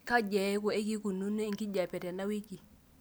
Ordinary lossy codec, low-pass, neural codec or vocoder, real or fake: none; none; none; real